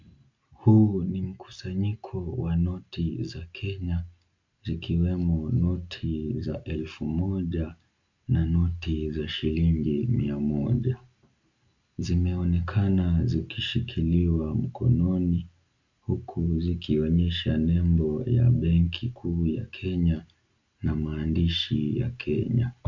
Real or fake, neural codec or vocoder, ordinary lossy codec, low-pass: real; none; MP3, 48 kbps; 7.2 kHz